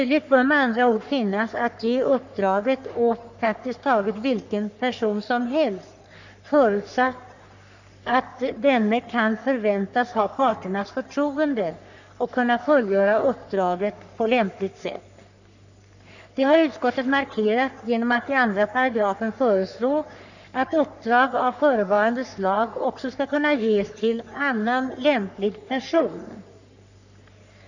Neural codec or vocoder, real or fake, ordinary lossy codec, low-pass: codec, 44.1 kHz, 3.4 kbps, Pupu-Codec; fake; none; 7.2 kHz